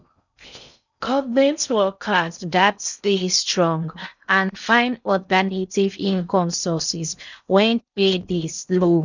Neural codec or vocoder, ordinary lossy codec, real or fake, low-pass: codec, 16 kHz in and 24 kHz out, 0.6 kbps, FocalCodec, streaming, 4096 codes; none; fake; 7.2 kHz